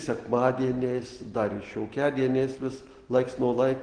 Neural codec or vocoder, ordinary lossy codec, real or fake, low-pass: none; Opus, 16 kbps; real; 9.9 kHz